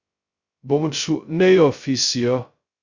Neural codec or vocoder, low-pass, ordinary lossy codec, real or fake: codec, 16 kHz, 0.2 kbps, FocalCodec; 7.2 kHz; Opus, 64 kbps; fake